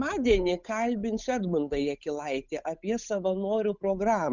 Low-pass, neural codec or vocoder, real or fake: 7.2 kHz; codec, 16 kHz, 8 kbps, FunCodec, trained on Chinese and English, 25 frames a second; fake